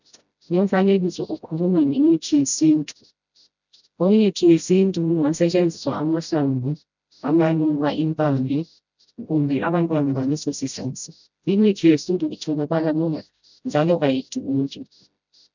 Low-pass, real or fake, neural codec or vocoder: 7.2 kHz; fake; codec, 16 kHz, 0.5 kbps, FreqCodec, smaller model